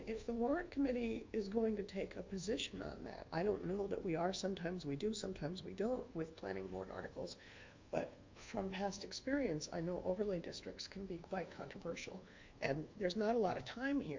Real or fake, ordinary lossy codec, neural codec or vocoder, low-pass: fake; MP3, 48 kbps; codec, 24 kHz, 1.2 kbps, DualCodec; 7.2 kHz